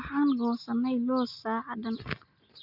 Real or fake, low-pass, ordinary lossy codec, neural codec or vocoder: real; 5.4 kHz; Opus, 64 kbps; none